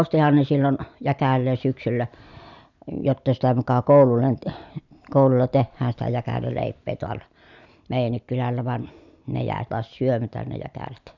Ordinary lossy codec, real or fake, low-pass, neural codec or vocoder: none; real; 7.2 kHz; none